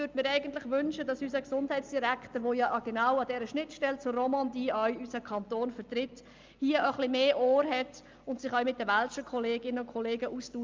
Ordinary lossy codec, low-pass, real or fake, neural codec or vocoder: Opus, 24 kbps; 7.2 kHz; real; none